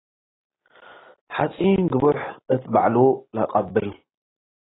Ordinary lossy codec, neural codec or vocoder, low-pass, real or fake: AAC, 16 kbps; none; 7.2 kHz; real